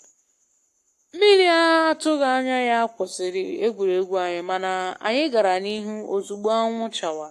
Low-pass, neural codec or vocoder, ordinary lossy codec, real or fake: 14.4 kHz; codec, 44.1 kHz, 7.8 kbps, Pupu-Codec; AAC, 64 kbps; fake